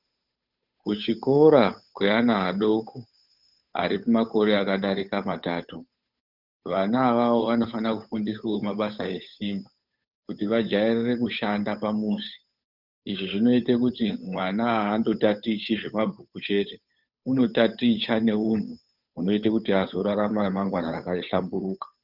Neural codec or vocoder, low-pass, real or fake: codec, 16 kHz, 8 kbps, FunCodec, trained on Chinese and English, 25 frames a second; 5.4 kHz; fake